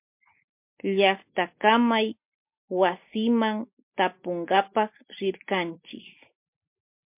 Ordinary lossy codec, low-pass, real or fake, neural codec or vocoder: MP3, 32 kbps; 3.6 kHz; real; none